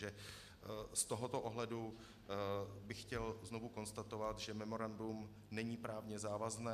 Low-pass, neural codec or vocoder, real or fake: 14.4 kHz; none; real